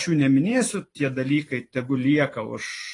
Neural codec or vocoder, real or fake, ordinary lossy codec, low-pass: vocoder, 44.1 kHz, 128 mel bands every 512 samples, BigVGAN v2; fake; AAC, 32 kbps; 10.8 kHz